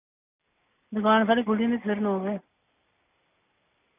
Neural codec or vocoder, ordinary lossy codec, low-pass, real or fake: none; none; 3.6 kHz; real